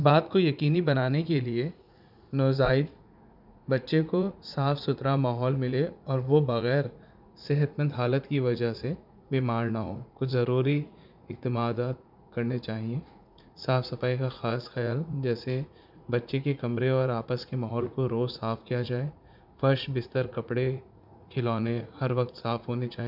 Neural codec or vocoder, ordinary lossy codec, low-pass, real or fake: vocoder, 44.1 kHz, 80 mel bands, Vocos; none; 5.4 kHz; fake